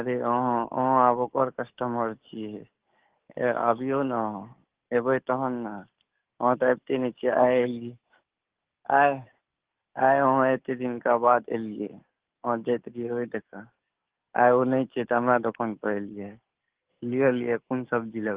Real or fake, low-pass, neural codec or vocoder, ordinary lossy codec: fake; 3.6 kHz; codec, 44.1 kHz, 7.8 kbps, DAC; Opus, 16 kbps